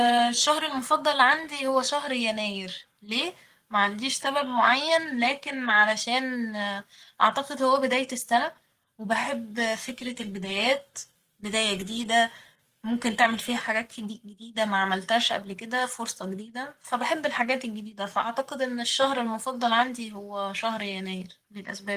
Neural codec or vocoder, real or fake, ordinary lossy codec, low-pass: codec, 44.1 kHz, 7.8 kbps, Pupu-Codec; fake; Opus, 24 kbps; 14.4 kHz